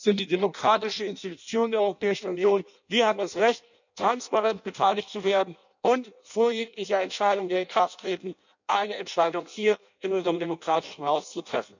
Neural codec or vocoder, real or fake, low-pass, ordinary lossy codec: codec, 16 kHz in and 24 kHz out, 0.6 kbps, FireRedTTS-2 codec; fake; 7.2 kHz; none